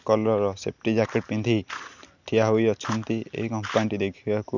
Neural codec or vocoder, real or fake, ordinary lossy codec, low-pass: none; real; none; 7.2 kHz